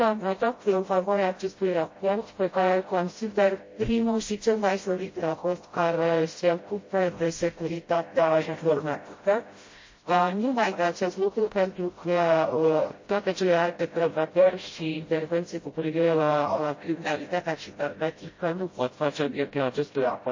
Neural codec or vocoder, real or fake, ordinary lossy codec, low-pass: codec, 16 kHz, 0.5 kbps, FreqCodec, smaller model; fake; MP3, 32 kbps; 7.2 kHz